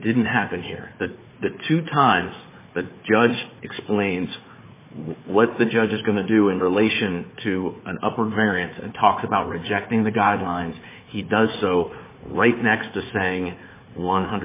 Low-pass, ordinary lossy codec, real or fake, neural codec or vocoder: 3.6 kHz; MP3, 16 kbps; fake; codec, 16 kHz, 4 kbps, FunCodec, trained on Chinese and English, 50 frames a second